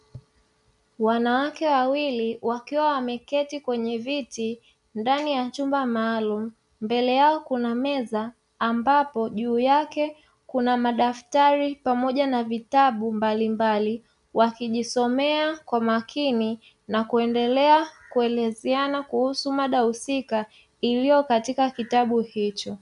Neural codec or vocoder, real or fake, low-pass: none; real; 10.8 kHz